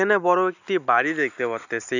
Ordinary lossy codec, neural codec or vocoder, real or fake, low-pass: none; none; real; 7.2 kHz